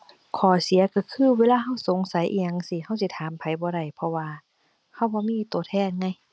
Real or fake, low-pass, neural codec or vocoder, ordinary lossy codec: real; none; none; none